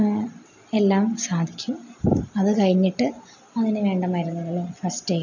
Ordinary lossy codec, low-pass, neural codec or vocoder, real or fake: none; 7.2 kHz; none; real